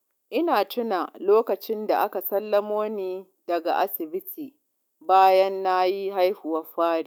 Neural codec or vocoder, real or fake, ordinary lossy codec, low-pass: autoencoder, 48 kHz, 128 numbers a frame, DAC-VAE, trained on Japanese speech; fake; none; none